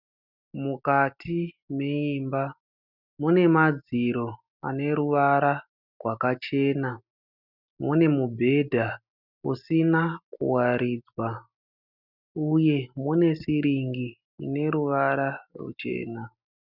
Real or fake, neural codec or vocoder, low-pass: real; none; 5.4 kHz